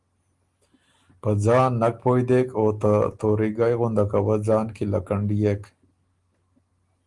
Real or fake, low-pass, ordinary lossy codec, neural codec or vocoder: real; 10.8 kHz; Opus, 24 kbps; none